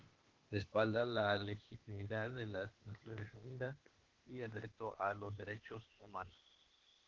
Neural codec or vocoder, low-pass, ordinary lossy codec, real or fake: codec, 16 kHz, 0.8 kbps, ZipCodec; 7.2 kHz; Opus, 16 kbps; fake